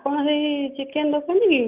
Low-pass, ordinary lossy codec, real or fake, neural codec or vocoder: 3.6 kHz; Opus, 24 kbps; real; none